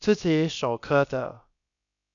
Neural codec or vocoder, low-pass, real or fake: codec, 16 kHz, about 1 kbps, DyCAST, with the encoder's durations; 7.2 kHz; fake